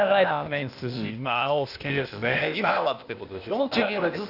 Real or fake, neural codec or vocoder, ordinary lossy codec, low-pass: fake; codec, 16 kHz, 0.8 kbps, ZipCodec; none; 5.4 kHz